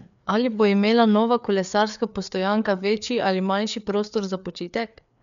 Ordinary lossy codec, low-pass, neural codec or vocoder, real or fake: MP3, 96 kbps; 7.2 kHz; codec, 16 kHz, 4 kbps, FreqCodec, larger model; fake